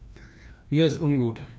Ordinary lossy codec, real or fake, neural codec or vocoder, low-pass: none; fake; codec, 16 kHz, 1 kbps, FreqCodec, larger model; none